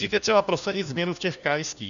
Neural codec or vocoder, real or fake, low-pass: codec, 16 kHz, 1 kbps, FunCodec, trained on LibriTTS, 50 frames a second; fake; 7.2 kHz